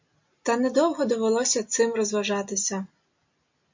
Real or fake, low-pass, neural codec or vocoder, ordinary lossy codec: real; 7.2 kHz; none; MP3, 48 kbps